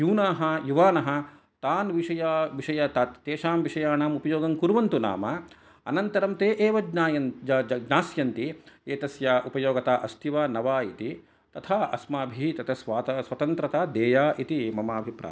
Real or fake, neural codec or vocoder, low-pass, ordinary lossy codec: real; none; none; none